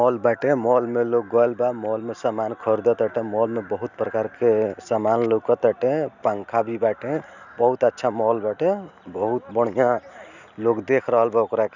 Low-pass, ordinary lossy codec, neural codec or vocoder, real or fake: 7.2 kHz; none; none; real